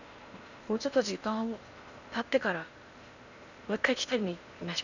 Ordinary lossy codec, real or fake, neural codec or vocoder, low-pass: none; fake; codec, 16 kHz in and 24 kHz out, 0.8 kbps, FocalCodec, streaming, 65536 codes; 7.2 kHz